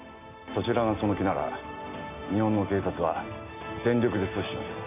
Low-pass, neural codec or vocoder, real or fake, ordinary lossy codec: 3.6 kHz; none; real; none